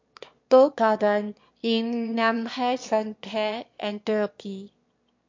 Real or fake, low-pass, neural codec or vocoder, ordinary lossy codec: fake; 7.2 kHz; autoencoder, 22.05 kHz, a latent of 192 numbers a frame, VITS, trained on one speaker; MP3, 48 kbps